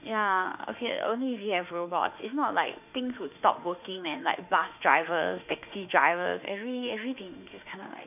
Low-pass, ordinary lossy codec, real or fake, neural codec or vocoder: 3.6 kHz; none; fake; autoencoder, 48 kHz, 32 numbers a frame, DAC-VAE, trained on Japanese speech